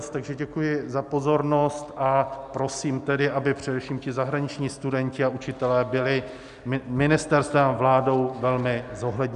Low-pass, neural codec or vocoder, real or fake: 10.8 kHz; none; real